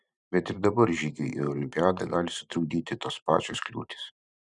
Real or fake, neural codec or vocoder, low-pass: fake; vocoder, 44.1 kHz, 128 mel bands every 512 samples, BigVGAN v2; 10.8 kHz